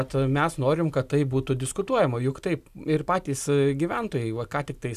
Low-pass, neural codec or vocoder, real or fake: 14.4 kHz; none; real